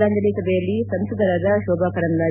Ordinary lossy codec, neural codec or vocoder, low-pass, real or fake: none; none; 3.6 kHz; real